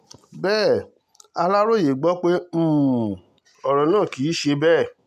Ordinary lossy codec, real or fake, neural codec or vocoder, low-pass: none; real; none; 14.4 kHz